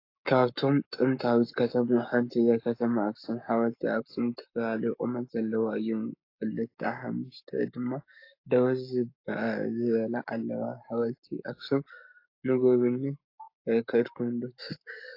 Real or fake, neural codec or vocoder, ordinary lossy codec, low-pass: fake; codec, 44.1 kHz, 7.8 kbps, Pupu-Codec; AAC, 32 kbps; 5.4 kHz